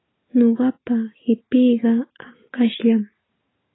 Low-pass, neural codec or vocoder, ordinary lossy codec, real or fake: 7.2 kHz; none; AAC, 16 kbps; real